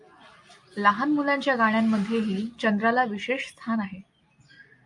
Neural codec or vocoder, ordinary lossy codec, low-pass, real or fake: none; AAC, 64 kbps; 10.8 kHz; real